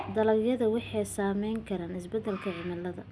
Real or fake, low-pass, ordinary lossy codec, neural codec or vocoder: real; none; none; none